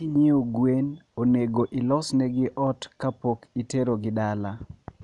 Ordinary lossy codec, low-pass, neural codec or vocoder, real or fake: none; 10.8 kHz; none; real